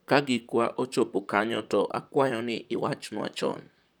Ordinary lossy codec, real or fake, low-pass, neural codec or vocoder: none; fake; none; vocoder, 44.1 kHz, 128 mel bands, Pupu-Vocoder